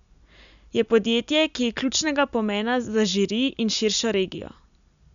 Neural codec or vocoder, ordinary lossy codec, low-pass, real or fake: none; none; 7.2 kHz; real